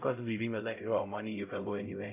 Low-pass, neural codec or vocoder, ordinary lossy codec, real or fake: 3.6 kHz; codec, 16 kHz, 0.5 kbps, X-Codec, HuBERT features, trained on LibriSpeech; none; fake